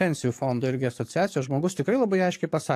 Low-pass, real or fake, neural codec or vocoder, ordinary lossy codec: 14.4 kHz; fake; codec, 44.1 kHz, 7.8 kbps, DAC; AAC, 64 kbps